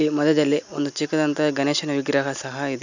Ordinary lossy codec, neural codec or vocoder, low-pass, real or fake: none; none; 7.2 kHz; real